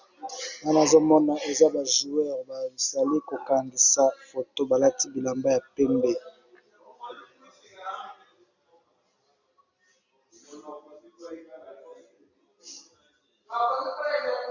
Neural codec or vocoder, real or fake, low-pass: none; real; 7.2 kHz